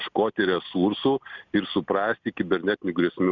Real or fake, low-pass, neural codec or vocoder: real; 7.2 kHz; none